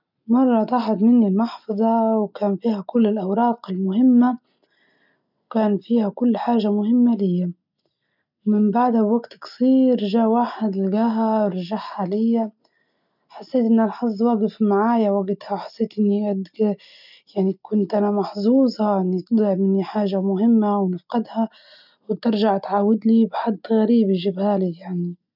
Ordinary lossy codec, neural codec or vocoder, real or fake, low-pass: none; none; real; 5.4 kHz